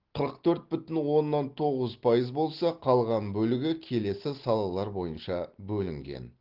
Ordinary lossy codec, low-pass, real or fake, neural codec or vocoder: Opus, 16 kbps; 5.4 kHz; real; none